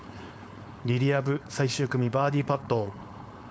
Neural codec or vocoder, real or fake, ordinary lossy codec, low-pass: codec, 16 kHz, 4.8 kbps, FACodec; fake; none; none